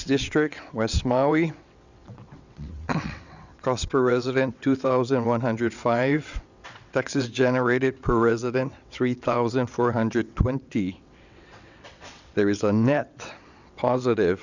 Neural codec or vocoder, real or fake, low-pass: vocoder, 22.05 kHz, 80 mel bands, WaveNeXt; fake; 7.2 kHz